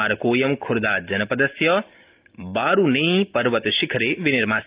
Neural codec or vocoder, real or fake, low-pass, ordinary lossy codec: none; real; 3.6 kHz; Opus, 24 kbps